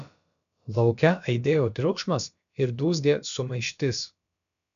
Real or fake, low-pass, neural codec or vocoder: fake; 7.2 kHz; codec, 16 kHz, about 1 kbps, DyCAST, with the encoder's durations